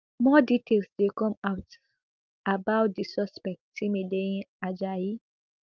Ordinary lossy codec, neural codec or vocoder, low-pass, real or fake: Opus, 24 kbps; none; 7.2 kHz; real